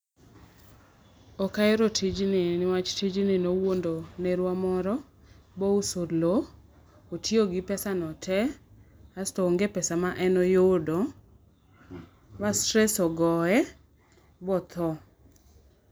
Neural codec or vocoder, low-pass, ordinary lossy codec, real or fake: none; none; none; real